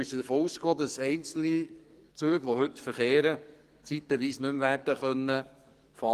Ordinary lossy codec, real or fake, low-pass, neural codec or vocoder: Opus, 24 kbps; fake; 14.4 kHz; codec, 44.1 kHz, 2.6 kbps, SNAC